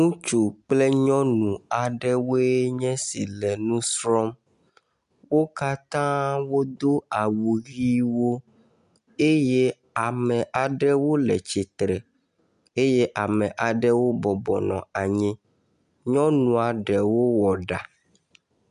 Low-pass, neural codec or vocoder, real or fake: 10.8 kHz; none; real